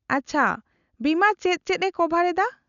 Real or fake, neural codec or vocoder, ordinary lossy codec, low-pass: real; none; none; 7.2 kHz